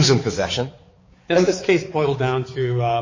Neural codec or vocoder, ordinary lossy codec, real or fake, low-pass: codec, 16 kHz, 4 kbps, X-Codec, HuBERT features, trained on balanced general audio; MP3, 32 kbps; fake; 7.2 kHz